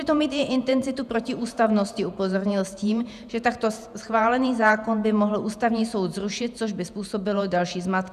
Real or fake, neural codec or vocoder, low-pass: fake; vocoder, 48 kHz, 128 mel bands, Vocos; 14.4 kHz